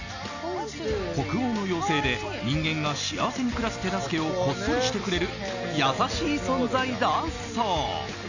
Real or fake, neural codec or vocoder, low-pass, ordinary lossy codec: real; none; 7.2 kHz; none